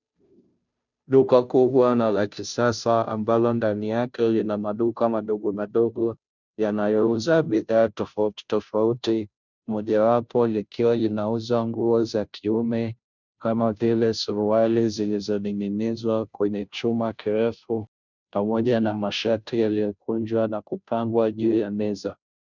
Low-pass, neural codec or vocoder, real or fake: 7.2 kHz; codec, 16 kHz, 0.5 kbps, FunCodec, trained on Chinese and English, 25 frames a second; fake